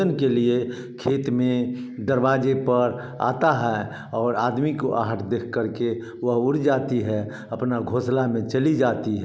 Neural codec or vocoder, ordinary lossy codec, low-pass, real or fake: none; none; none; real